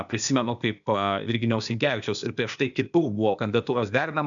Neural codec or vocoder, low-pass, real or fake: codec, 16 kHz, 0.8 kbps, ZipCodec; 7.2 kHz; fake